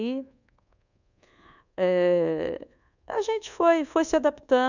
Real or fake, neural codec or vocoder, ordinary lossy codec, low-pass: fake; codec, 24 kHz, 1.2 kbps, DualCodec; none; 7.2 kHz